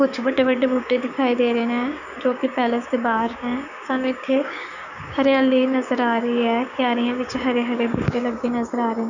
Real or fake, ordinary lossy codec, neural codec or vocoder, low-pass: fake; none; codec, 16 kHz, 6 kbps, DAC; 7.2 kHz